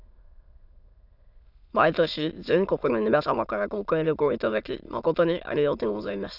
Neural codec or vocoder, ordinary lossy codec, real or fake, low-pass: autoencoder, 22.05 kHz, a latent of 192 numbers a frame, VITS, trained on many speakers; none; fake; 5.4 kHz